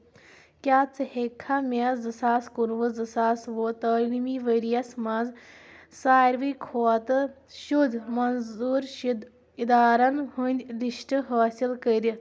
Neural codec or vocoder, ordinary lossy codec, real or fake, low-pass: none; none; real; none